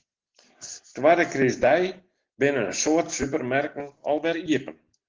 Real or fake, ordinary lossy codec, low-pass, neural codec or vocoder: real; Opus, 16 kbps; 7.2 kHz; none